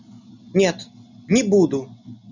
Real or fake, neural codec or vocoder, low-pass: real; none; 7.2 kHz